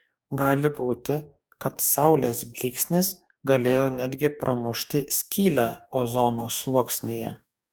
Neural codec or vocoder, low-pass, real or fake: codec, 44.1 kHz, 2.6 kbps, DAC; 19.8 kHz; fake